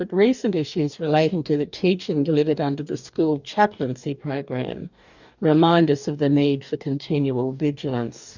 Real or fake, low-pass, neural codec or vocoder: fake; 7.2 kHz; codec, 44.1 kHz, 2.6 kbps, DAC